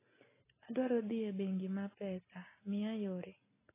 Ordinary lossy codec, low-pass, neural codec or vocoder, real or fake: AAC, 16 kbps; 3.6 kHz; none; real